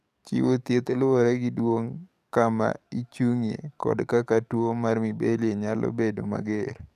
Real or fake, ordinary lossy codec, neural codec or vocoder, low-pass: fake; none; codec, 44.1 kHz, 7.8 kbps, DAC; 14.4 kHz